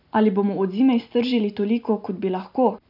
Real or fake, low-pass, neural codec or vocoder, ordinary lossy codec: real; 5.4 kHz; none; none